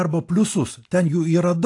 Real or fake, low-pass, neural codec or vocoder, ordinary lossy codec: real; 10.8 kHz; none; AAC, 48 kbps